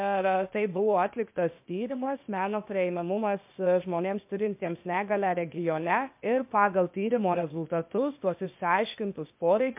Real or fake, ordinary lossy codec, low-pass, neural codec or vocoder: fake; MP3, 32 kbps; 3.6 kHz; codec, 16 kHz, 0.8 kbps, ZipCodec